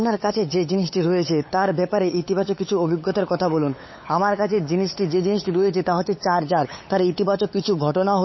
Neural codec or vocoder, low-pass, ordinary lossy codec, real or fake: codec, 16 kHz, 8 kbps, FunCodec, trained on LibriTTS, 25 frames a second; 7.2 kHz; MP3, 24 kbps; fake